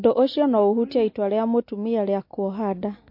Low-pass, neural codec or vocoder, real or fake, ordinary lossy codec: 5.4 kHz; none; real; MP3, 32 kbps